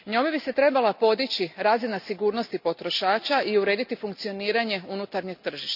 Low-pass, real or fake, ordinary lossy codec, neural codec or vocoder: 5.4 kHz; real; none; none